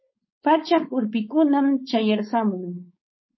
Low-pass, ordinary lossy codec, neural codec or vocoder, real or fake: 7.2 kHz; MP3, 24 kbps; codec, 16 kHz, 4.8 kbps, FACodec; fake